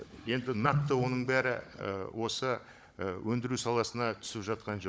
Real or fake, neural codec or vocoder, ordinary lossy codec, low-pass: fake; codec, 16 kHz, 16 kbps, FunCodec, trained on Chinese and English, 50 frames a second; none; none